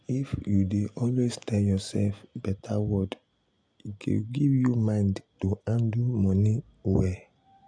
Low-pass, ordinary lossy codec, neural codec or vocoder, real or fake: 9.9 kHz; none; none; real